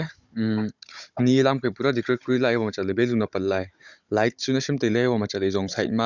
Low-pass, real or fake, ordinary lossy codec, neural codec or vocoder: 7.2 kHz; fake; none; codec, 16 kHz, 16 kbps, FunCodec, trained on Chinese and English, 50 frames a second